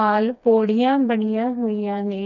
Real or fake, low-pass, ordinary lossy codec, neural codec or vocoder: fake; 7.2 kHz; none; codec, 16 kHz, 2 kbps, FreqCodec, smaller model